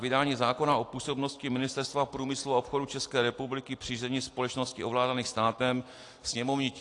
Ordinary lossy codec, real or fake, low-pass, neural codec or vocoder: AAC, 48 kbps; real; 10.8 kHz; none